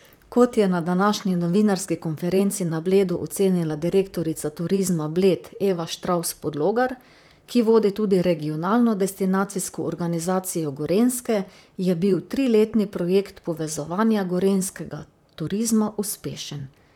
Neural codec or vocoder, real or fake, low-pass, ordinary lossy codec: vocoder, 44.1 kHz, 128 mel bands, Pupu-Vocoder; fake; 19.8 kHz; none